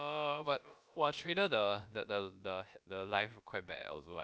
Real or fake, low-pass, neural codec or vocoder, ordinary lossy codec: fake; none; codec, 16 kHz, about 1 kbps, DyCAST, with the encoder's durations; none